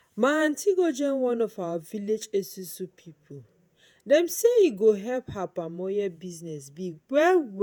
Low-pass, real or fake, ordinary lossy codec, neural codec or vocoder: none; fake; none; vocoder, 48 kHz, 128 mel bands, Vocos